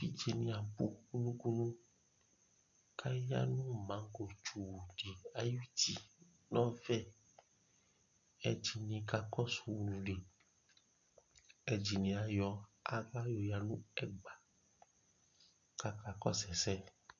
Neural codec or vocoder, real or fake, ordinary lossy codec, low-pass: none; real; MP3, 48 kbps; 7.2 kHz